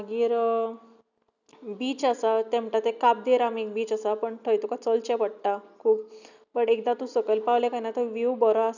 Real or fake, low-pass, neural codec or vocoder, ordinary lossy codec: real; 7.2 kHz; none; none